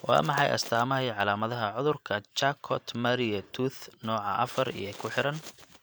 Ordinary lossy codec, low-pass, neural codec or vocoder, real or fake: none; none; vocoder, 44.1 kHz, 128 mel bands every 512 samples, BigVGAN v2; fake